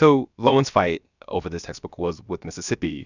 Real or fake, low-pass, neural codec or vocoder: fake; 7.2 kHz; codec, 16 kHz, about 1 kbps, DyCAST, with the encoder's durations